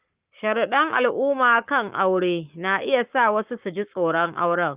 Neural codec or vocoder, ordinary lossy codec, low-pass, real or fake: codec, 44.1 kHz, 7.8 kbps, Pupu-Codec; Opus, 24 kbps; 3.6 kHz; fake